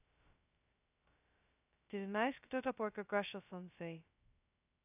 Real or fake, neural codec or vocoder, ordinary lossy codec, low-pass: fake; codec, 16 kHz, 0.2 kbps, FocalCodec; none; 3.6 kHz